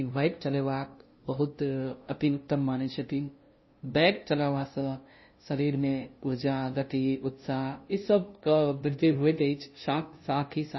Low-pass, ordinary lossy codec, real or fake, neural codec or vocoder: 7.2 kHz; MP3, 24 kbps; fake; codec, 16 kHz, 0.5 kbps, FunCodec, trained on LibriTTS, 25 frames a second